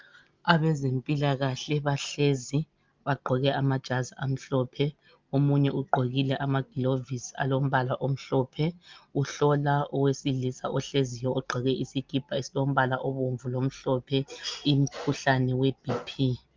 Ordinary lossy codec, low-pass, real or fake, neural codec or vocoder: Opus, 24 kbps; 7.2 kHz; real; none